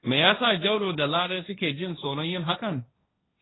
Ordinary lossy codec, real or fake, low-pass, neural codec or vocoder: AAC, 16 kbps; fake; 7.2 kHz; codec, 16 kHz, 1.1 kbps, Voila-Tokenizer